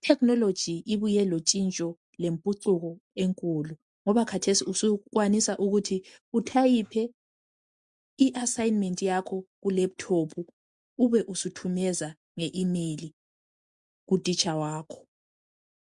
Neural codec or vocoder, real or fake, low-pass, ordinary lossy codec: none; real; 10.8 kHz; MP3, 64 kbps